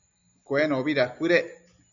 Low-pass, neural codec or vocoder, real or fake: 7.2 kHz; none; real